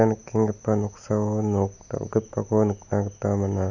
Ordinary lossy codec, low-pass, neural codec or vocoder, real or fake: none; 7.2 kHz; none; real